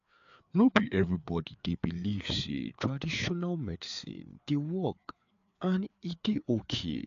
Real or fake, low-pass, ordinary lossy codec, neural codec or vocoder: fake; 7.2 kHz; AAC, 64 kbps; codec, 16 kHz, 4 kbps, FreqCodec, larger model